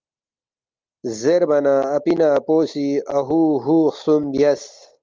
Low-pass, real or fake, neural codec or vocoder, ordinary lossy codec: 7.2 kHz; real; none; Opus, 24 kbps